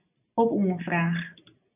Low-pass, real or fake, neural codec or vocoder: 3.6 kHz; real; none